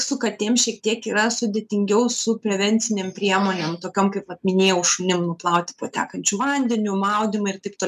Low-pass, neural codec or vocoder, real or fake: 14.4 kHz; none; real